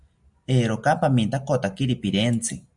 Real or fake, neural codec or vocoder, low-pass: real; none; 10.8 kHz